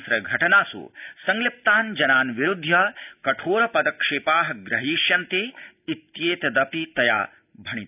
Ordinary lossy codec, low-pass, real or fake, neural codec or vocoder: none; 3.6 kHz; real; none